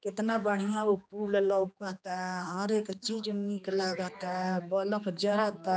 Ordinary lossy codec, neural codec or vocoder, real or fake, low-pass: none; codec, 16 kHz, 2 kbps, X-Codec, HuBERT features, trained on general audio; fake; none